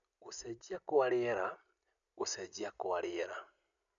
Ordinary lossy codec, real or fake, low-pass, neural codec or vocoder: none; real; 7.2 kHz; none